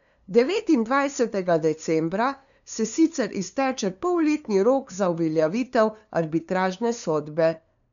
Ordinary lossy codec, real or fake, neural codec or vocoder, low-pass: none; fake; codec, 16 kHz, 2 kbps, FunCodec, trained on LibriTTS, 25 frames a second; 7.2 kHz